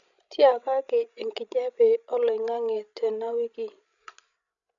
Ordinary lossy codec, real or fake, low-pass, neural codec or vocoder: none; fake; 7.2 kHz; codec, 16 kHz, 16 kbps, FreqCodec, larger model